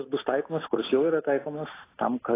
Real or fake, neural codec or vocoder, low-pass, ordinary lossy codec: real; none; 3.6 kHz; AAC, 16 kbps